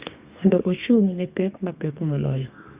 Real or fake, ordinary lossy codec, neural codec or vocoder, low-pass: fake; Opus, 64 kbps; codec, 32 kHz, 1.9 kbps, SNAC; 3.6 kHz